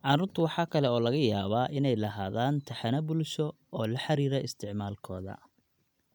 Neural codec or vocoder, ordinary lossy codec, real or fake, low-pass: none; none; real; 19.8 kHz